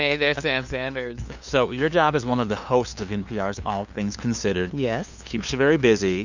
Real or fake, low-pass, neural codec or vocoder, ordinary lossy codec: fake; 7.2 kHz; codec, 16 kHz, 2 kbps, FunCodec, trained on LibriTTS, 25 frames a second; Opus, 64 kbps